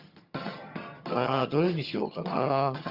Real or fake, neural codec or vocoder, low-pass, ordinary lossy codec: fake; vocoder, 22.05 kHz, 80 mel bands, HiFi-GAN; 5.4 kHz; Opus, 64 kbps